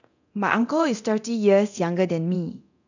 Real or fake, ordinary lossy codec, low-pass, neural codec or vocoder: fake; none; 7.2 kHz; codec, 24 kHz, 0.9 kbps, DualCodec